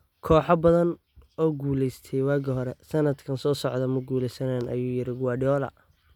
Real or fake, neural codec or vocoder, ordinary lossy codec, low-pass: real; none; none; 19.8 kHz